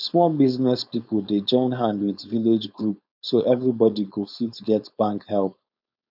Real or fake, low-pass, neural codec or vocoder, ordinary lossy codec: fake; 5.4 kHz; codec, 16 kHz, 4.8 kbps, FACodec; none